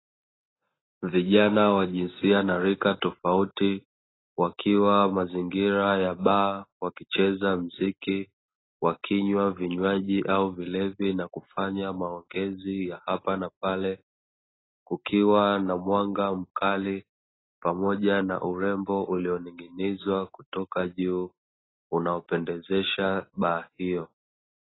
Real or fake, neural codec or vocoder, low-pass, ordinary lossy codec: real; none; 7.2 kHz; AAC, 16 kbps